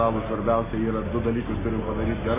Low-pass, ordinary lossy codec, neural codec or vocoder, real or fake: 3.6 kHz; MP3, 16 kbps; none; real